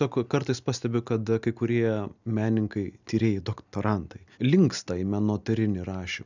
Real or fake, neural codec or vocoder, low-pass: real; none; 7.2 kHz